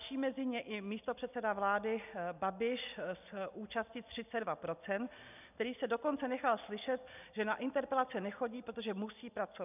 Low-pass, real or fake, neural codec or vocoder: 3.6 kHz; real; none